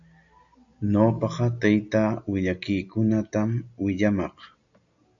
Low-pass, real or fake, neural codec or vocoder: 7.2 kHz; real; none